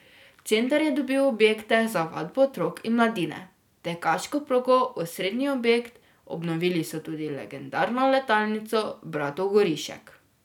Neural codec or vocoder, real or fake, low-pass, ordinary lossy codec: none; real; 19.8 kHz; none